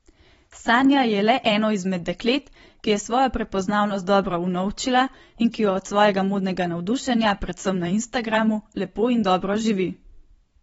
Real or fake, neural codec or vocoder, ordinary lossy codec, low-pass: fake; vocoder, 44.1 kHz, 128 mel bands every 512 samples, BigVGAN v2; AAC, 24 kbps; 19.8 kHz